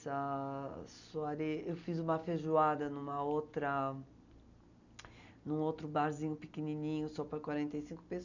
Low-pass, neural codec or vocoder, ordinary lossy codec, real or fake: 7.2 kHz; none; none; real